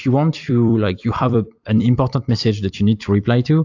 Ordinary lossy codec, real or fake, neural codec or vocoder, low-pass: AAC, 48 kbps; fake; vocoder, 44.1 kHz, 128 mel bands every 256 samples, BigVGAN v2; 7.2 kHz